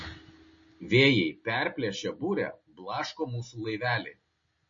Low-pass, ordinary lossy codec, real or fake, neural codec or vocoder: 7.2 kHz; MP3, 32 kbps; real; none